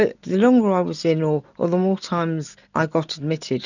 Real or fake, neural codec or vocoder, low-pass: real; none; 7.2 kHz